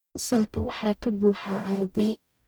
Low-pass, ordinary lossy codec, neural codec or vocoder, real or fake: none; none; codec, 44.1 kHz, 0.9 kbps, DAC; fake